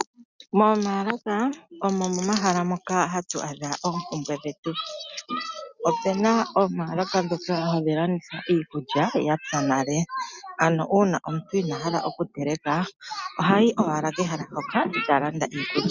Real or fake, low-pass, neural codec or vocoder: real; 7.2 kHz; none